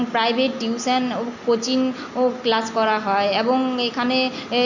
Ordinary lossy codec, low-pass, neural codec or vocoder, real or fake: none; 7.2 kHz; none; real